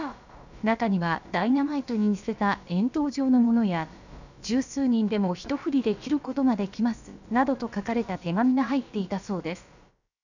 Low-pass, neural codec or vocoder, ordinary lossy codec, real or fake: 7.2 kHz; codec, 16 kHz, about 1 kbps, DyCAST, with the encoder's durations; none; fake